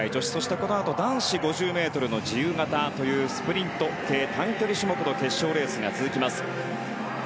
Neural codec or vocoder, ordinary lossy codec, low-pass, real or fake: none; none; none; real